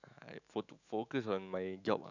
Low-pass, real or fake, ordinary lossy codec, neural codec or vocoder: 7.2 kHz; fake; none; autoencoder, 48 kHz, 128 numbers a frame, DAC-VAE, trained on Japanese speech